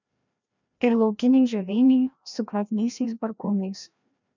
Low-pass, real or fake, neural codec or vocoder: 7.2 kHz; fake; codec, 16 kHz, 1 kbps, FreqCodec, larger model